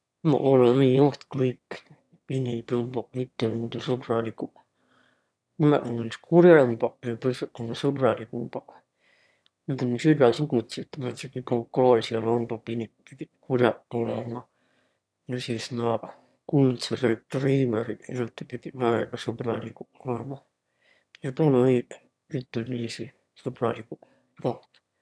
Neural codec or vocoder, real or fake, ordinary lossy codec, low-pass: autoencoder, 22.05 kHz, a latent of 192 numbers a frame, VITS, trained on one speaker; fake; none; none